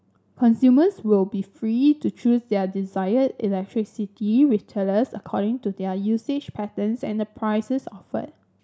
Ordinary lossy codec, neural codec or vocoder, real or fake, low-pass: none; none; real; none